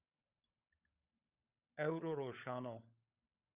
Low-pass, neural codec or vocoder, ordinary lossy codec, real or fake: 3.6 kHz; codec, 16 kHz, 16 kbps, FunCodec, trained on LibriTTS, 50 frames a second; Opus, 64 kbps; fake